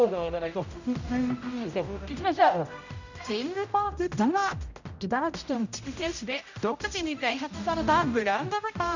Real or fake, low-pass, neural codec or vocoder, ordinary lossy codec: fake; 7.2 kHz; codec, 16 kHz, 0.5 kbps, X-Codec, HuBERT features, trained on general audio; none